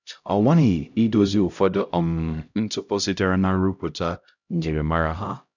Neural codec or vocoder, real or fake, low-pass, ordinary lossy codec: codec, 16 kHz, 0.5 kbps, X-Codec, HuBERT features, trained on LibriSpeech; fake; 7.2 kHz; none